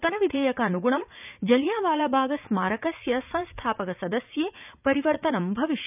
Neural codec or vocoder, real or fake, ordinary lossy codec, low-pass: vocoder, 44.1 kHz, 80 mel bands, Vocos; fake; none; 3.6 kHz